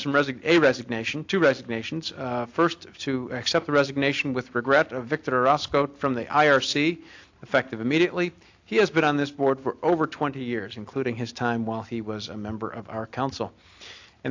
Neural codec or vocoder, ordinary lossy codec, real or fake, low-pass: none; AAC, 48 kbps; real; 7.2 kHz